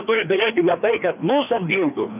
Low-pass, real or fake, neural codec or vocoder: 3.6 kHz; fake; codec, 16 kHz, 2 kbps, FreqCodec, larger model